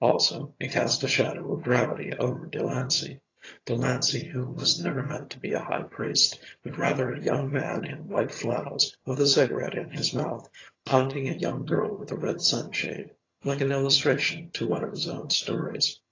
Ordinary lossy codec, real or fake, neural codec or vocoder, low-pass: AAC, 32 kbps; fake; vocoder, 22.05 kHz, 80 mel bands, HiFi-GAN; 7.2 kHz